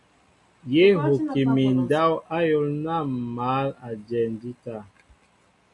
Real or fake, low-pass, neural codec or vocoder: real; 10.8 kHz; none